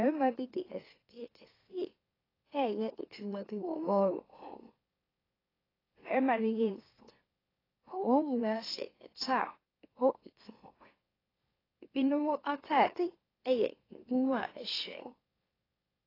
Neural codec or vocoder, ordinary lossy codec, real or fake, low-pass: autoencoder, 44.1 kHz, a latent of 192 numbers a frame, MeloTTS; AAC, 24 kbps; fake; 5.4 kHz